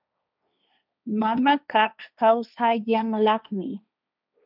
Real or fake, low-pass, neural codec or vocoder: fake; 5.4 kHz; codec, 16 kHz, 1.1 kbps, Voila-Tokenizer